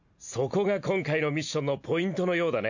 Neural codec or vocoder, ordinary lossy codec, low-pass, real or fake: none; none; 7.2 kHz; real